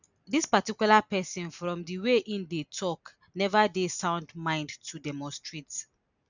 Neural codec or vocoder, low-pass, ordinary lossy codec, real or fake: none; 7.2 kHz; none; real